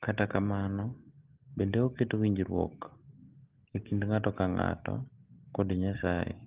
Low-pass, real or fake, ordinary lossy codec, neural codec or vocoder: 3.6 kHz; real; Opus, 16 kbps; none